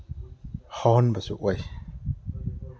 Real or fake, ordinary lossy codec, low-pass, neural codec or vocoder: real; none; none; none